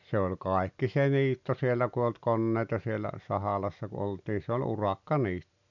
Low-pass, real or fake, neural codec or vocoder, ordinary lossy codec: 7.2 kHz; real; none; none